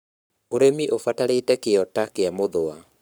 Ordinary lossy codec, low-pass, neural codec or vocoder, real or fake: none; none; codec, 44.1 kHz, 7.8 kbps, Pupu-Codec; fake